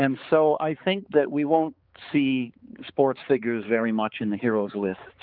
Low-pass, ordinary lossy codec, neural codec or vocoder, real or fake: 5.4 kHz; Opus, 24 kbps; codec, 16 kHz, 4 kbps, X-Codec, HuBERT features, trained on balanced general audio; fake